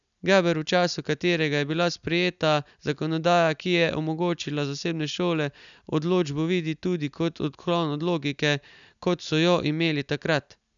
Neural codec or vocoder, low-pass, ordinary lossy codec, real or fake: none; 7.2 kHz; none; real